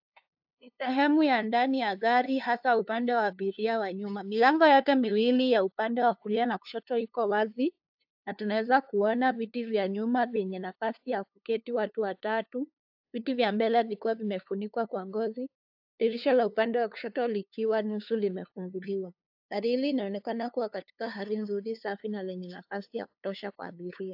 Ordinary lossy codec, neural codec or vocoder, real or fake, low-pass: AAC, 48 kbps; codec, 16 kHz, 2 kbps, FunCodec, trained on LibriTTS, 25 frames a second; fake; 5.4 kHz